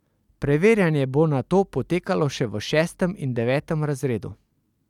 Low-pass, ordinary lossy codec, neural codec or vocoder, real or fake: 19.8 kHz; none; none; real